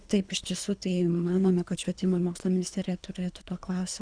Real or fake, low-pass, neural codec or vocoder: fake; 9.9 kHz; codec, 24 kHz, 3 kbps, HILCodec